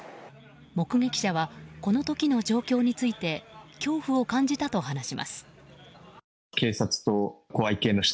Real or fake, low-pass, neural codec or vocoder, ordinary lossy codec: real; none; none; none